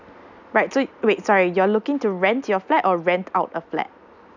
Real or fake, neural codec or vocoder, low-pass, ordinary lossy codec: real; none; 7.2 kHz; none